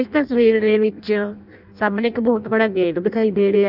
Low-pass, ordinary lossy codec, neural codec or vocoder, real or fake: 5.4 kHz; none; codec, 16 kHz in and 24 kHz out, 0.6 kbps, FireRedTTS-2 codec; fake